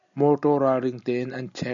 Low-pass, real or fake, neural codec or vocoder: 7.2 kHz; real; none